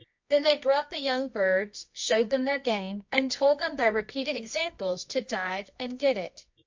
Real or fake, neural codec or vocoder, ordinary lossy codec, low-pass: fake; codec, 24 kHz, 0.9 kbps, WavTokenizer, medium music audio release; MP3, 48 kbps; 7.2 kHz